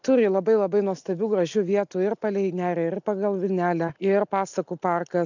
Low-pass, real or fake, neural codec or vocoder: 7.2 kHz; real; none